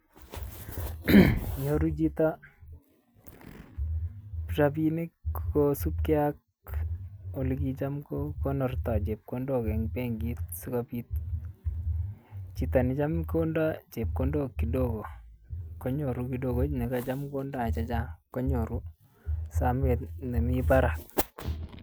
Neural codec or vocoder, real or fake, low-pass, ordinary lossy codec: none; real; none; none